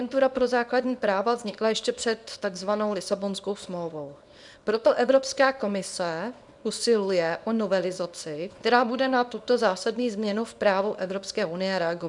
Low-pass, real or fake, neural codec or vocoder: 10.8 kHz; fake; codec, 24 kHz, 0.9 kbps, WavTokenizer, small release